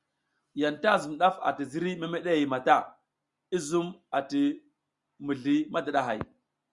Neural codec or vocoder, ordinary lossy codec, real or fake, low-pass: none; Opus, 64 kbps; real; 10.8 kHz